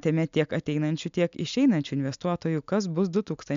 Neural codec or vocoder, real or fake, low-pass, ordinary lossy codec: none; real; 7.2 kHz; MP3, 64 kbps